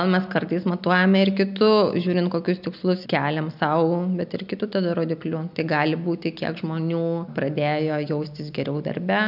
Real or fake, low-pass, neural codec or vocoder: real; 5.4 kHz; none